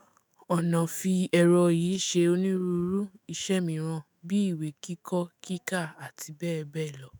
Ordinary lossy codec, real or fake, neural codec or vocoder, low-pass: none; fake; autoencoder, 48 kHz, 128 numbers a frame, DAC-VAE, trained on Japanese speech; none